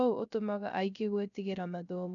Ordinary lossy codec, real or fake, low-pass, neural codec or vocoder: none; fake; 7.2 kHz; codec, 16 kHz, 0.3 kbps, FocalCodec